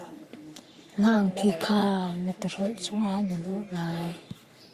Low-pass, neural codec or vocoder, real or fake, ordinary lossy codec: 14.4 kHz; codec, 44.1 kHz, 3.4 kbps, Pupu-Codec; fake; Opus, 64 kbps